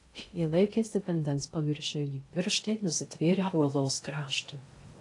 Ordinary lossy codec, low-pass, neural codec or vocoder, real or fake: AAC, 48 kbps; 10.8 kHz; codec, 16 kHz in and 24 kHz out, 0.6 kbps, FocalCodec, streaming, 2048 codes; fake